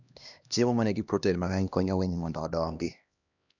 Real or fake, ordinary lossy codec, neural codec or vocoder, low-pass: fake; none; codec, 16 kHz, 1 kbps, X-Codec, HuBERT features, trained on LibriSpeech; 7.2 kHz